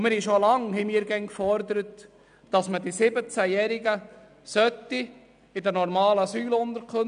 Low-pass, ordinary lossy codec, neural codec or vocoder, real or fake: 9.9 kHz; none; none; real